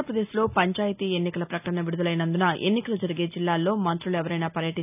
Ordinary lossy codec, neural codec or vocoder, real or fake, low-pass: none; none; real; 3.6 kHz